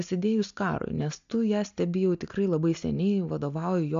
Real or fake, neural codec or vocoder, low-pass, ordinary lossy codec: real; none; 7.2 kHz; AAC, 64 kbps